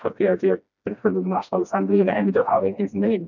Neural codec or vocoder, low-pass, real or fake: codec, 16 kHz, 1 kbps, FreqCodec, smaller model; 7.2 kHz; fake